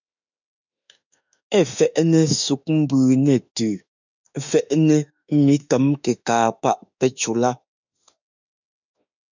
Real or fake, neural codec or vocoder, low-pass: fake; autoencoder, 48 kHz, 32 numbers a frame, DAC-VAE, trained on Japanese speech; 7.2 kHz